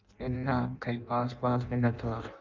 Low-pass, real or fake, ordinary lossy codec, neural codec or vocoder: 7.2 kHz; fake; Opus, 24 kbps; codec, 16 kHz in and 24 kHz out, 0.6 kbps, FireRedTTS-2 codec